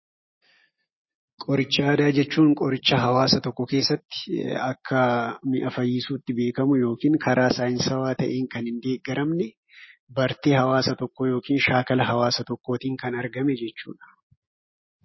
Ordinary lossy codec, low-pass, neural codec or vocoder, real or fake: MP3, 24 kbps; 7.2 kHz; none; real